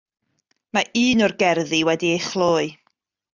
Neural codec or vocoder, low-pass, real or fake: none; 7.2 kHz; real